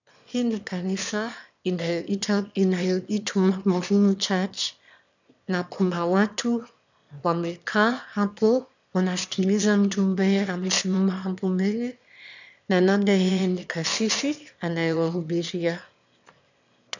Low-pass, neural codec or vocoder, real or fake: 7.2 kHz; autoencoder, 22.05 kHz, a latent of 192 numbers a frame, VITS, trained on one speaker; fake